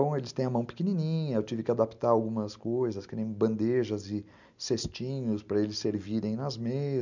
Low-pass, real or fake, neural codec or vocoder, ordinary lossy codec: 7.2 kHz; real; none; none